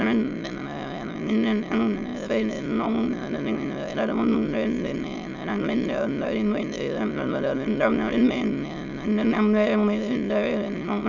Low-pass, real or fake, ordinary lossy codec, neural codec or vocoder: 7.2 kHz; fake; none; autoencoder, 22.05 kHz, a latent of 192 numbers a frame, VITS, trained on many speakers